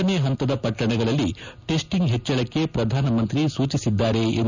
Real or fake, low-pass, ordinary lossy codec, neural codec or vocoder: real; 7.2 kHz; none; none